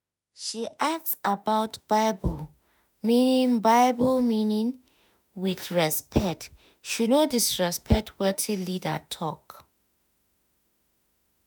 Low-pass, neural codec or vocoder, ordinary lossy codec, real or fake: none; autoencoder, 48 kHz, 32 numbers a frame, DAC-VAE, trained on Japanese speech; none; fake